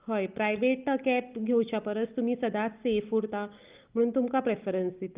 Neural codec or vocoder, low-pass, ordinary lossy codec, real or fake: none; 3.6 kHz; Opus, 32 kbps; real